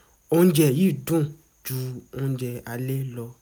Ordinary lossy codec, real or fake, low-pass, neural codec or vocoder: none; real; none; none